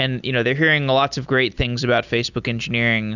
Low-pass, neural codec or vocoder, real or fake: 7.2 kHz; none; real